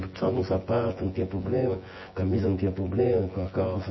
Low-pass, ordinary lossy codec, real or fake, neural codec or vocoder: 7.2 kHz; MP3, 24 kbps; fake; vocoder, 24 kHz, 100 mel bands, Vocos